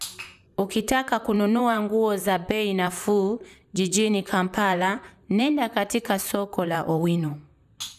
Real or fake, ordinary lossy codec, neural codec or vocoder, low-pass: fake; none; vocoder, 44.1 kHz, 128 mel bands, Pupu-Vocoder; 14.4 kHz